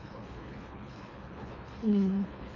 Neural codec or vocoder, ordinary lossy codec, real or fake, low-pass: codec, 24 kHz, 3 kbps, HILCodec; AAC, 48 kbps; fake; 7.2 kHz